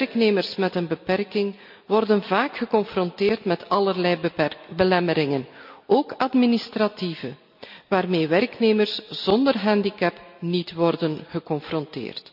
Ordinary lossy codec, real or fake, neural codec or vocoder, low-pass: none; real; none; 5.4 kHz